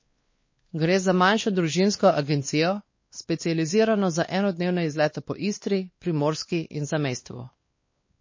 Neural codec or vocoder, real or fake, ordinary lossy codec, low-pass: codec, 16 kHz, 2 kbps, X-Codec, WavLM features, trained on Multilingual LibriSpeech; fake; MP3, 32 kbps; 7.2 kHz